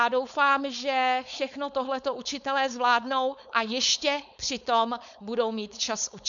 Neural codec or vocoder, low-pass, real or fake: codec, 16 kHz, 4.8 kbps, FACodec; 7.2 kHz; fake